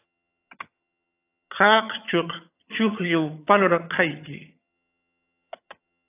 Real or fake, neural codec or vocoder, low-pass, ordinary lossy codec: fake; vocoder, 22.05 kHz, 80 mel bands, HiFi-GAN; 3.6 kHz; AAC, 24 kbps